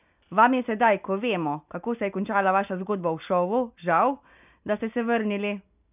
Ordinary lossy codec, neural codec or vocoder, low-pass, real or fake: none; none; 3.6 kHz; real